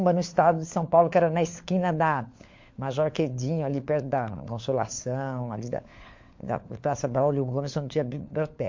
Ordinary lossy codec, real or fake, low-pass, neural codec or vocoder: MP3, 48 kbps; fake; 7.2 kHz; codec, 16 kHz, 4 kbps, FunCodec, trained on LibriTTS, 50 frames a second